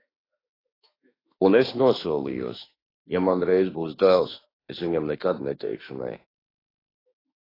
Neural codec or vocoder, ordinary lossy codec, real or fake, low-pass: autoencoder, 48 kHz, 32 numbers a frame, DAC-VAE, trained on Japanese speech; AAC, 24 kbps; fake; 5.4 kHz